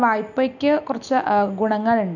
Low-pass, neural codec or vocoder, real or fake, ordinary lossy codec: 7.2 kHz; none; real; none